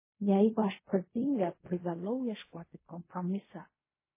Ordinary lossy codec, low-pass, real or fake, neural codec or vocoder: MP3, 16 kbps; 3.6 kHz; fake; codec, 16 kHz in and 24 kHz out, 0.4 kbps, LongCat-Audio-Codec, fine tuned four codebook decoder